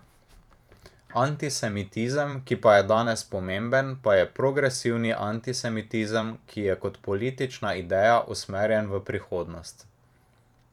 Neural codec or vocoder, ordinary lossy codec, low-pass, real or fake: vocoder, 44.1 kHz, 128 mel bands every 512 samples, BigVGAN v2; none; 19.8 kHz; fake